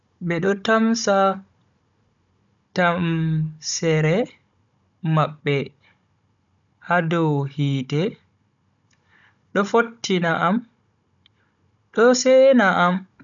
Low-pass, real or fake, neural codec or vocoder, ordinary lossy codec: 7.2 kHz; fake; codec, 16 kHz, 16 kbps, FunCodec, trained on Chinese and English, 50 frames a second; none